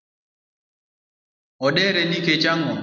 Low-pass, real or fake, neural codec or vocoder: 7.2 kHz; real; none